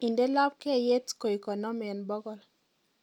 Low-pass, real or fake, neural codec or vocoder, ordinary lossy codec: 19.8 kHz; real; none; none